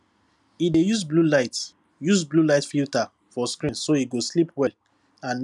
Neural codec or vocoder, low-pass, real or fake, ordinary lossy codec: none; 10.8 kHz; real; none